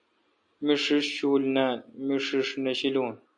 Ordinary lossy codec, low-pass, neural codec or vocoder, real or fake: AAC, 64 kbps; 9.9 kHz; none; real